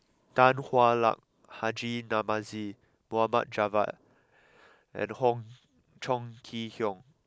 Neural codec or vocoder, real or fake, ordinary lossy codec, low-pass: none; real; none; none